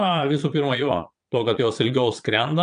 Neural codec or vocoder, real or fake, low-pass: vocoder, 22.05 kHz, 80 mel bands, Vocos; fake; 9.9 kHz